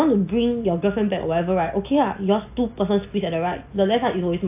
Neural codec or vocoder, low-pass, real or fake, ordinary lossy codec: none; 3.6 kHz; real; AAC, 32 kbps